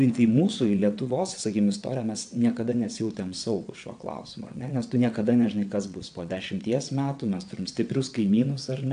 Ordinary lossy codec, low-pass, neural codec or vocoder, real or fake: AAC, 64 kbps; 9.9 kHz; vocoder, 22.05 kHz, 80 mel bands, WaveNeXt; fake